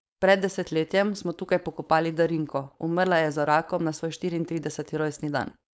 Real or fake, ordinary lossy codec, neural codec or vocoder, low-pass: fake; none; codec, 16 kHz, 4.8 kbps, FACodec; none